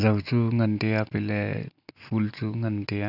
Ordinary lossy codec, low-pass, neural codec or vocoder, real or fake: none; 5.4 kHz; none; real